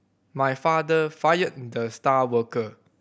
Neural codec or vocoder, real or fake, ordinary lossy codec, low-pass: none; real; none; none